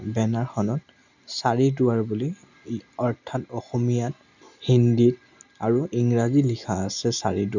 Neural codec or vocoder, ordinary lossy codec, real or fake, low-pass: none; none; real; 7.2 kHz